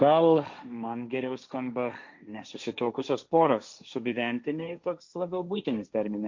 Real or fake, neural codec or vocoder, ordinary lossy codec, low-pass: fake; codec, 16 kHz, 1.1 kbps, Voila-Tokenizer; MP3, 64 kbps; 7.2 kHz